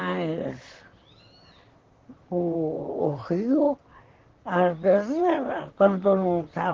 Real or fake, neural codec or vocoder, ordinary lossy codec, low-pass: fake; vocoder, 44.1 kHz, 128 mel bands, Pupu-Vocoder; Opus, 16 kbps; 7.2 kHz